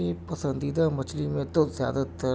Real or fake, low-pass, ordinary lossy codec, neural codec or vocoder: real; none; none; none